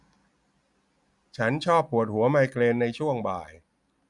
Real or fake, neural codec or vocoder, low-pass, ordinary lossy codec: real; none; 10.8 kHz; none